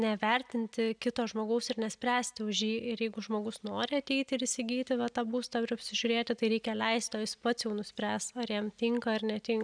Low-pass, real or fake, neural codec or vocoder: 9.9 kHz; real; none